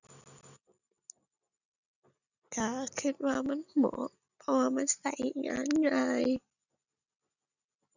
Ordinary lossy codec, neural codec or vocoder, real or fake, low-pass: none; none; real; 7.2 kHz